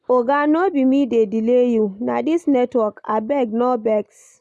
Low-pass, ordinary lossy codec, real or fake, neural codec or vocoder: none; none; real; none